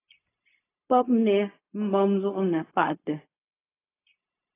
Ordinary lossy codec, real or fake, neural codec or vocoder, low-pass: AAC, 24 kbps; fake; codec, 16 kHz, 0.4 kbps, LongCat-Audio-Codec; 3.6 kHz